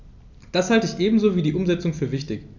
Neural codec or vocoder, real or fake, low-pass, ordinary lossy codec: none; real; 7.2 kHz; none